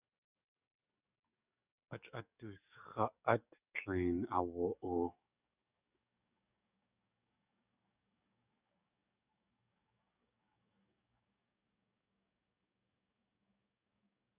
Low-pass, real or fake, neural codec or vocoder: 3.6 kHz; fake; codec, 44.1 kHz, 7.8 kbps, DAC